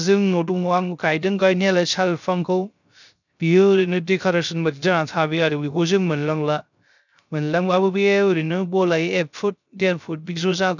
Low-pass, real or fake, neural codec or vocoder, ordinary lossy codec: 7.2 kHz; fake; codec, 16 kHz, 0.3 kbps, FocalCodec; none